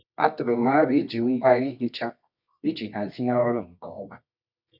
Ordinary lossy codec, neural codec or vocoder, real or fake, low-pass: none; codec, 24 kHz, 0.9 kbps, WavTokenizer, medium music audio release; fake; 5.4 kHz